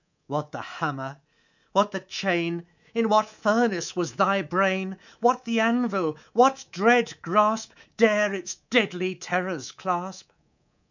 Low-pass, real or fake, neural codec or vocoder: 7.2 kHz; fake; codec, 24 kHz, 3.1 kbps, DualCodec